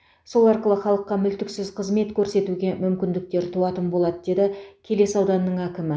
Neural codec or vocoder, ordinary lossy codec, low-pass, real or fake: none; none; none; real